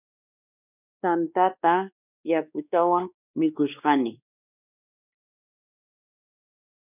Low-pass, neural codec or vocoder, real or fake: 3.6 kHz; codec, 16 kHz, 2 kbps, X-Codec, WavLM features, trained on Multilingual LibriSpeech; fake